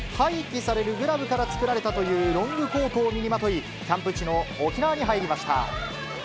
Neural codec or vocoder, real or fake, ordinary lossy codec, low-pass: none; real; none; none